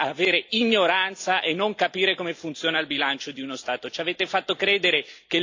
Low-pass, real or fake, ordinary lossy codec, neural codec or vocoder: 7.2 kHz; real; AAC, 48 kbps; none